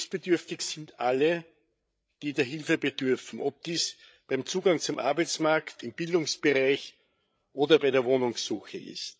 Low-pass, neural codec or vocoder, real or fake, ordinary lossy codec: none; codec, 16 kHz, 8 kbps, FreqCodec, larger model; fake; none